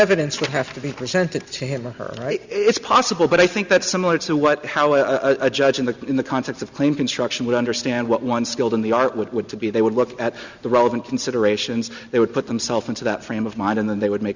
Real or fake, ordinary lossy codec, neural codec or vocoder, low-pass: real; Opus, 64 kbps; none; 7.2 kHz